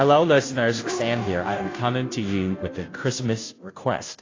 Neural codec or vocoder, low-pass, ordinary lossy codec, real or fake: codec, 16 kHz, 0.5 kbps, FunCodec, trained on Chinese and English, 25 frames a second; 7.2 kHz; AAC, 32 kbps; fake